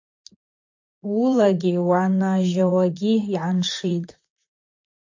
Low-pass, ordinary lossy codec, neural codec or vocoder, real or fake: 7.2 kHz; MP3, 48 kbps; vocoder, 44.1 kHz, 128 mel bands, Pupu-Vocoder; fake